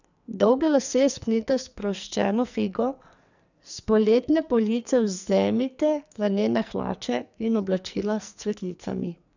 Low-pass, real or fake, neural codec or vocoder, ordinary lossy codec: 7.2 kHz; fake; codec, 44.1 kHz, 2.6 kbps, SNAC; none